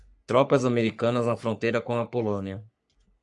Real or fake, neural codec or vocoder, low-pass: fake; codec, 44.1 kHz, 3.4 kbps, Pupu-Codec; 10.8 kHz